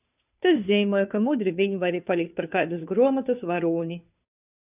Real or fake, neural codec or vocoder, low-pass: fake; codec, 16 kHz, 2 kbps, FunCodec, trained on Chinese and English, 25 frames a second; 3.6 kHz